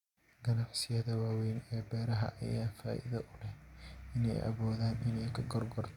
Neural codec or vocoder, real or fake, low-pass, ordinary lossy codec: none; real; 19.8 kHz; Opus, 64 kbps